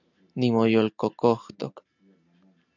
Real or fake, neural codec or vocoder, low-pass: real; none; 7.2 kHz